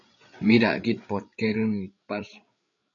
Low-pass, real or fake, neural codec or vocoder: 7.2 kHz; fake; codec, 16 kHz, 8 kbps, FreqCodec, larger model